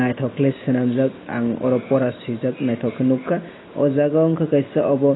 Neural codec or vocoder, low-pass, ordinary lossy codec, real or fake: none; 7.2 kHz; AAC, 16 kbps; real